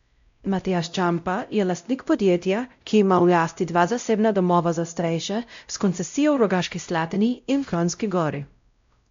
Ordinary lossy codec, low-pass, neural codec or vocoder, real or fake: MP3, 64 kbps; 7.2 kHz; codec, 16 kHz, 0.5 kbps, X-Codec, WavLM features, trained on Multilingual LibriSpeech; fake